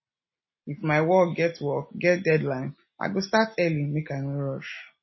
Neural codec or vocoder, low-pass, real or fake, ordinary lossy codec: none; 7.2 kHz; real; MP3, 24 kbps